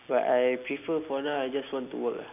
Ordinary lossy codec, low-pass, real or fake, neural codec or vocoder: none; 3.6 kHz; real; none